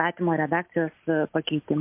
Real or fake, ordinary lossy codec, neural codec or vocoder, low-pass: real; MP3, 32 kbps; none; 3.6 kHz